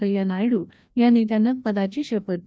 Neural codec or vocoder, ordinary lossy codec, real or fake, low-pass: codec, 16 kHz, 1 kbps, FreqCodec, larger model; none; fake; none